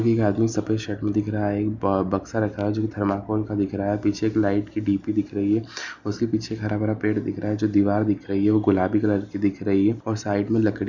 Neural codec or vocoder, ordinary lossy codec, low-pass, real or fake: none; none; 7.2 kHz; real